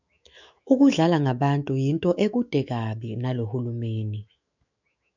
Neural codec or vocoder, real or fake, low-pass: autoencoder, 48 kHz, 128 numbers a frame, DAC-VAE, trained on Japanese speech; fake; 7.2 kHz